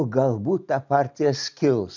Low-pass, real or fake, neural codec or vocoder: 7.2 kHz; real; none